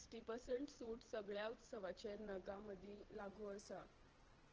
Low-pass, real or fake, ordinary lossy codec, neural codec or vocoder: 7.2 kHz; fake; Opus, 16 kbps; vocoder, 22.05 kHz, 80 mel bands, WaveNeXt